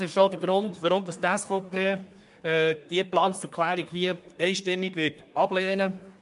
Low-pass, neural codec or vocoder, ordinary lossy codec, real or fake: 10.8 kHz; codec, 24 kHz, 1 kbps, SNAC; MP3, 64 kbps; fake